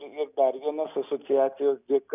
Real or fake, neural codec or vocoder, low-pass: real; none; 3.6 kHz